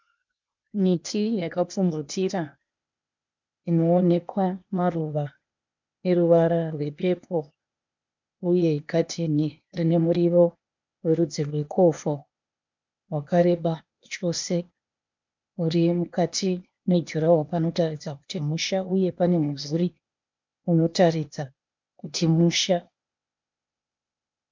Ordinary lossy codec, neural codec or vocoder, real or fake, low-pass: MP3, 64 kbps; codec, 16 kHz, 0.8 kbps, ZipCodec; fake; 7.2 kHz